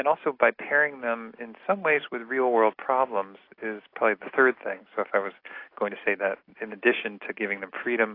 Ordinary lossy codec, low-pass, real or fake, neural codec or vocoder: AAC, 32 kbps; 5.4 kHz; real; none